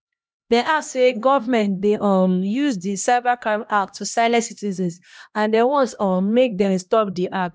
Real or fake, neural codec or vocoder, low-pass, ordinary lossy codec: fake; codec, 16 kHz, 1 kbps, X-Codec, HuBERT features, trained on LibriSpeech; none; none